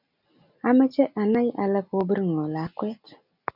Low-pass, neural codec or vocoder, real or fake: 5.4 kHz; none; real